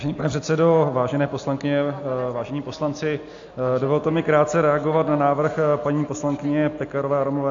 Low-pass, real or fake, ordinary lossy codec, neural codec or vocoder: 7.2 kHz; real; AAC, 48 kbps; none